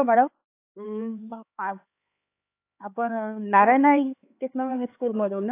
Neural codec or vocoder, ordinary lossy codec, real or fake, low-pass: codec, 16 kHz, 4 kbps, X-Codec, HuBERT features, trained on LibriSpeech; AAC, 24 kbps; fake; 3.6 kHz